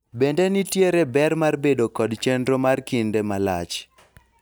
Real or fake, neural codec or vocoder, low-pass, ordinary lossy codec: real; none; none; none